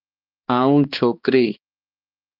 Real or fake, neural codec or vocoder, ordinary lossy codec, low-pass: fake; codec, 16 kHz, 2 kbps, X-Codec, HuBERT features, trained on balanced general audio; Opus, 24 kbps; 5.4 kHz